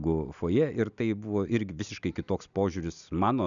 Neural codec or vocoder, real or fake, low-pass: none; real; 7.2 kHz